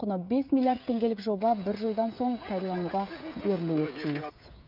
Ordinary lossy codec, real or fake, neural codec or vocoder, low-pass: none; fake; codec, 16 kHz, 16 kbps, FreqCodec, smaller model; 5.4 kHz